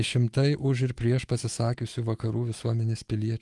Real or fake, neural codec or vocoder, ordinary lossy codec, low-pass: fake; autoencoder, 48 kHz, 128 numbers a frame, DAC-VAE, trained on Japanese speech; Opus, 24 kbps; 10.8 kHz